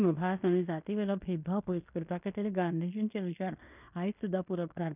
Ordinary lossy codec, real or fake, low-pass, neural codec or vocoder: none; fake; 3.6 kHz; codec, 16 kHz in and 24 kHz out, 0.9 kbps, LongCat-Audio-Codec, fine tuned four codebook decoder